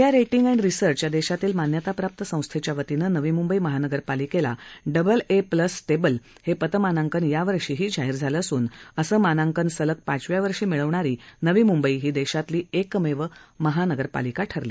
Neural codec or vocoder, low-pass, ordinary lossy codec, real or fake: none; none; none; real